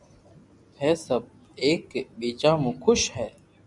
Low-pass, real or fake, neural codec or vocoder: 10.8 kHz; real; none